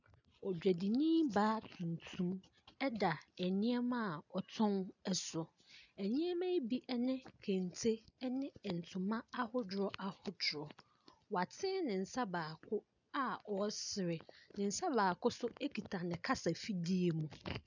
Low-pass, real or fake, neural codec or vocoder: 7.2 kHz; real; none